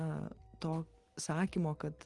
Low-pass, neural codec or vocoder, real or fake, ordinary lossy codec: 10.8 kHz; none; real; Opus, 24 kbps